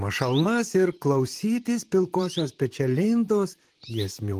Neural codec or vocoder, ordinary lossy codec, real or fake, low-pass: vocoder, 44.1 kHz, 128 mel bands, Pupu-Vocoder; Opus, 24 kbps; fake; 14.4 kHz